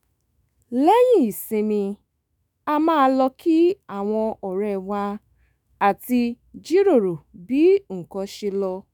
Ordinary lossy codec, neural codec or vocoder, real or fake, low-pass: none; autoencoder, 48 kHz, 128 numbers a frame, DAC-VAE, trained on Japanese speech; fake; none